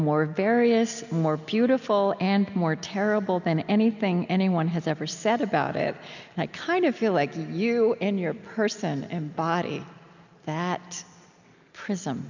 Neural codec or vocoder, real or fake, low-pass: none; real; 7.2 kHz